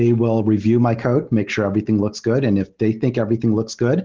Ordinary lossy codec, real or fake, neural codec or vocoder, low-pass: Opus, 16 kbps; real; none; 7.2 kHz